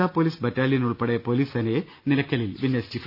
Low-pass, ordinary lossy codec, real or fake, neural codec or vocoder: 5.4 kHz; none; real; none